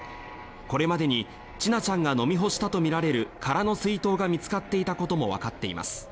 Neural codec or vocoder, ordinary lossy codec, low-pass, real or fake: none; none; none; real